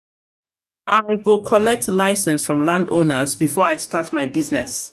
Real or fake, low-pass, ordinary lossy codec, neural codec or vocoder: fake; 14.4 kHz; none; codec, 44.1 kHz, 2.6 kbps, DAC